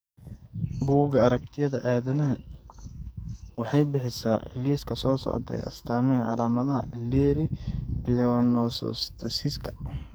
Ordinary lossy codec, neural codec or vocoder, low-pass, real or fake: none; codec, 44.1 kHz, 2.6 kbps, SNAC; none; fake